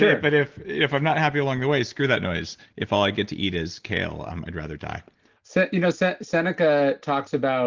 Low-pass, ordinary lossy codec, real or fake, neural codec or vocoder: 7.2 kHz; Opus, 16 kbps; real; none